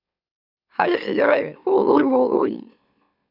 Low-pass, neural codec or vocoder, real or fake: 5.4 kHz; autoencoder, 44.1 kHz, a latent of 192 numbers a frame, MeloTTS; fake